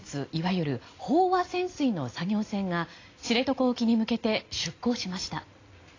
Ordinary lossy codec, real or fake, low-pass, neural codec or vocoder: AAC, 32 kbps; real; 7.2 kHz; none